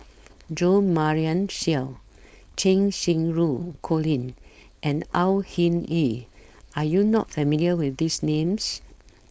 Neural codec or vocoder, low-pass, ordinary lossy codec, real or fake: codec, 16 kHz, 4.8 kbps, FACodec; none; none; fake